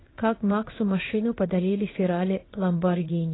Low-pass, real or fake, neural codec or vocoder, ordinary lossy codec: 7.2 kHz; fake; codec, 16 kHz in and 24 kHz out, 1 kbps, XY-Tokenizer; AAC, 16 kbps